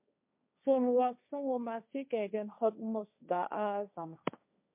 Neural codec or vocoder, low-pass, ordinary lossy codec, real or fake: codec, 16 kHz, 1.1 kbps, Voila-Tokenizer; 3.6 kHz; MP3, 32 kbps; fake